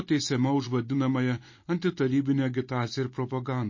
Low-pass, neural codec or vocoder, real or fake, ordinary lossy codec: 7.2 kHz; none; real; MP3, 32 kbps